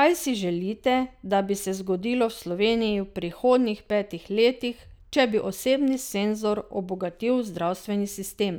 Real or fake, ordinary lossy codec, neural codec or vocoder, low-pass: real; none; none; none